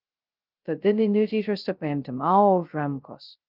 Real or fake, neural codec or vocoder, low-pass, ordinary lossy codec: fake; codec, 16 kHz, 0.2 kbps, FocalCodec; 5.4 kHz; Opus, 32 kbps